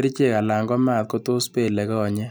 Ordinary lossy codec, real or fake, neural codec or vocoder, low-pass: none; real; none; none